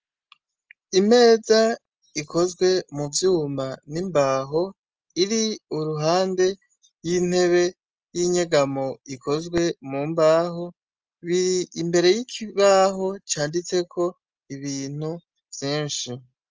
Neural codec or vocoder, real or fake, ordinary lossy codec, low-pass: none; real; Opus, 24 kbps; 7.2 kHz